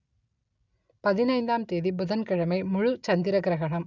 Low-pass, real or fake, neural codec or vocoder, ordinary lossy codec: 7.2 kHz; real; none; none